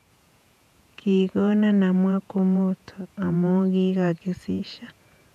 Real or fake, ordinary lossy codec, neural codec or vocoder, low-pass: fake; none; vocoder, 48 kHz, 128 mel bands, Vocos; 14.4 kHz